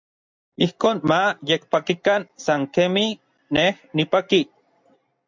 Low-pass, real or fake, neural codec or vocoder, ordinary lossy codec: 7.2 kHz; real; none; MP3, 64 kbps